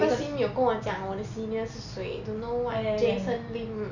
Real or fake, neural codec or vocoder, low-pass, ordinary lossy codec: real; none; 7.2 kHz; none